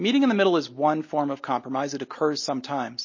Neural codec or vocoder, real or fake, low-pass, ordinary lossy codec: none; real; 7.2 kHz; MP3, 32 kbps